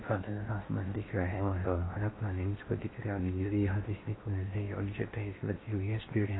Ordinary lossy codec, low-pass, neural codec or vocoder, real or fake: AAC, 16 kbps; 7.2 kHz; codec, 16 kHz in and 24 kHz out, 0.6 kbps, FocalCodec, streaming, 4096 codes; fake